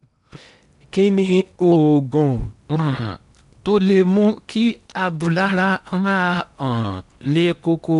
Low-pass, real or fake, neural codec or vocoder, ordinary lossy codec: 10.8 kHz; fake; codec, 16 kHz in and 24 kHz out, 0.8 kbps, FocalCodec, streaming, 65536 codes; MP3, 96 kbps